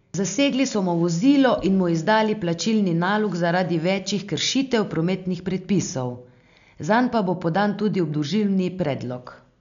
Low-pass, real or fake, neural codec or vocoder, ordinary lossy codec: 7.2 kHz; real; none; none